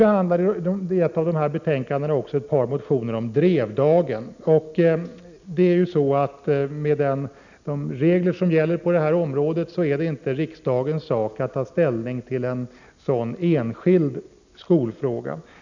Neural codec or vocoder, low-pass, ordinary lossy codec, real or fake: none; 7.2 kHz; none; real